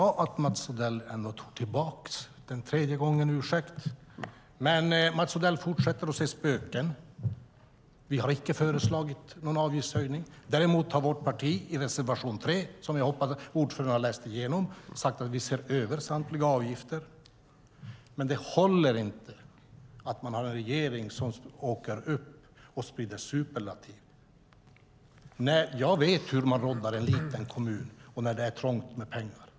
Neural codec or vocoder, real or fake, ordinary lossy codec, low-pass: none; real; none; none